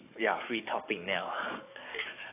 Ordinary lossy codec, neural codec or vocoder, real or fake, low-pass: AAC, 24 kbps; codec, 44.1 kHz, 7.8 kbps, DAC; fake; 3.6 kHz